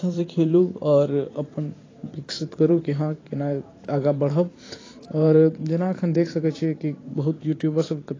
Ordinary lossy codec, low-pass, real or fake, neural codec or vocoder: AAC, 32 kbps; 7.2 kHz; fake; vocoder, 44.1 kHz, 128 mel bands every 512 samples, BigVGAN v2